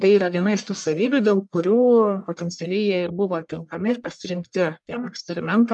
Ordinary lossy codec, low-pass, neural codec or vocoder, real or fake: MP3, 96 kbps; 10.8 kHz; codec, 44.1 kHz, 1.7 kbps, Pupu-Codec; fake